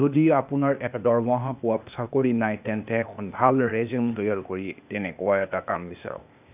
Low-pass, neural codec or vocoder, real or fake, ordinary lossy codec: 3.6 kHz; codec, 16 kHz, 0.8 kbps, ZipCodec; fake; none